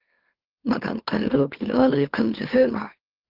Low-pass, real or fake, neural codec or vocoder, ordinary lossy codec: 5.4 kHz; fake; autoencoder, 44.1 kHz, a latent of 192 numbers a frame, MeloTTS; Opus, 16 kbps